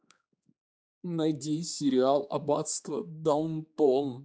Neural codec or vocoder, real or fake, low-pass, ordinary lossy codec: codec, 16 kHz, 4 kbps, X-Codec, HuBERT features, trained on general audio; fake; none; none